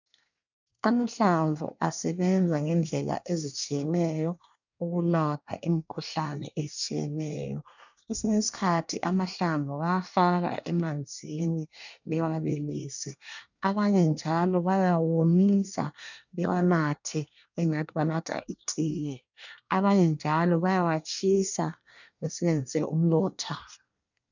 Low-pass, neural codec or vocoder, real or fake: 7.2 kHz; codec, 24 kHz, 1 kbps, SNAC; fake